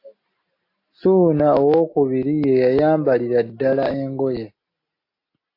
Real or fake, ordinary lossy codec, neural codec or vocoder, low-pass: real; AAC, 32 kbps; none; 5.4 kHz